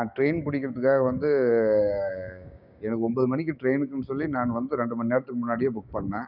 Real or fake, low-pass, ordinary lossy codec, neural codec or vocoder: fake; 5.4 kHz; none; codec, 16 kHz, 6 kbps, DAC